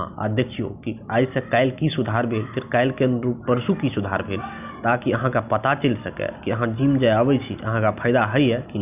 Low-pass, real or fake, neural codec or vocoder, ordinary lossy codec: 3.6 kHz; real; none; none